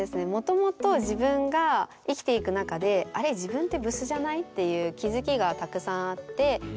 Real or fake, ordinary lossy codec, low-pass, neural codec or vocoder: real; none; none; none